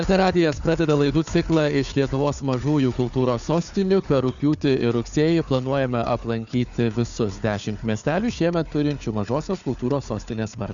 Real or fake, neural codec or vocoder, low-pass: fake; codec, 16 kHz, 4 kbps, FunCodec, trained on Chinese and English, 50 frames a second; 7.2 kHz